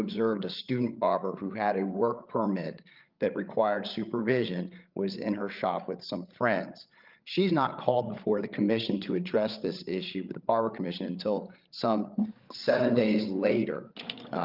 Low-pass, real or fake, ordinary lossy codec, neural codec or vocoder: 5.4 kHz; fake; Opus, 24 kbps; codec, 16 kHz, 8 kbps, FreqCodec, larger model